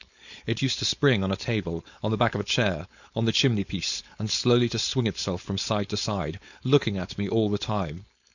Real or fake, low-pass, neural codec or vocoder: fake; 7.2 kHz; codec, 16 kHz, 4.8 kbps, FACodec